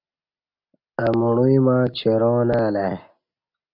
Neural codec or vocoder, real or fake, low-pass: none; real; 5.4 kHz